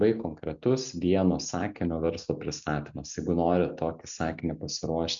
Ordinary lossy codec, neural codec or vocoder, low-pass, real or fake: MP3, 64 kbps; none; 7.2 kHz; real